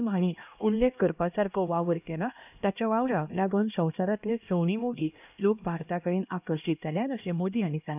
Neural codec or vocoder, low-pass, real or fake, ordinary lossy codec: codec, 16 kHz, 1 kbps, X-Codec, HuBERT features, trained on LibriSpeech; 3.6 kHz; fake; none